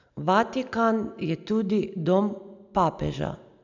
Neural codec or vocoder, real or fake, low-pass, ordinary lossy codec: none; real; 7.2 kHz; none